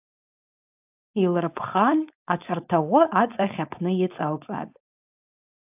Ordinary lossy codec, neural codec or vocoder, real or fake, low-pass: AAC, 32 kbps; codec, 16 kHz, 4.8 kbps, FACodec; fake; 3.6 kHz